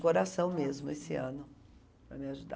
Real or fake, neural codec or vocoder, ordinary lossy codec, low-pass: real; none; none; none